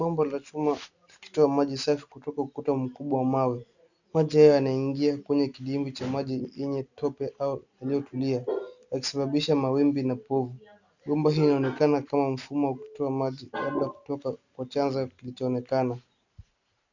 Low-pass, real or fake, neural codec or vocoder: 7.2 kHz; real; none